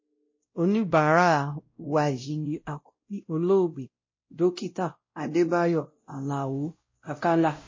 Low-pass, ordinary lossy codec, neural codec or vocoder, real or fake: 7.2 kHz; MP3, 32 kbps; codec, 16 kHz, 0.5 kbps, X-Codec, WavLM features, trained on Multilingual LibriSpeech; fake